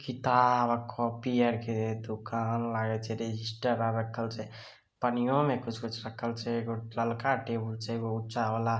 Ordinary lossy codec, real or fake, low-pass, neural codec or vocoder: none; real; none; none